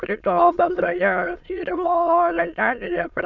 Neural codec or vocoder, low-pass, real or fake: autoencoder, 22.05 kHz, a latent of 192 numbers a frame, VITS, trained on many speakers; 7.2 kHz; fake